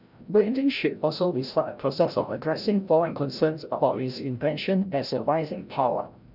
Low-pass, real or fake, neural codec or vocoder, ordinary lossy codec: 5.4 kHz; fake; codec, 16 kHz, 0.5 kbps, FreqCodec, larger model; none